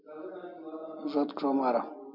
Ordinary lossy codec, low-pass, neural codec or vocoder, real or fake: MP3, 48 kbps; 5.4 kHz; none; real